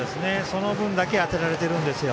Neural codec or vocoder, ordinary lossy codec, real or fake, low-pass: none; none; real; none